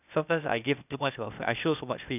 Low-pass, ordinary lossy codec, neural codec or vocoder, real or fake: 3.6 kHz; none; codec, 16 kHz, 0.8 kbps, ZipCodec; fake